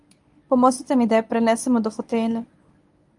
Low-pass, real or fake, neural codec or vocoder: 10.8 kHz; fake; codec, 24 kHz, 0.9 kbps, WavTokenizer, medium speech release version 1